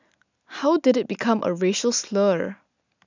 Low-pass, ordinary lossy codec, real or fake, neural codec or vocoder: 7.2 kHz; none; real; none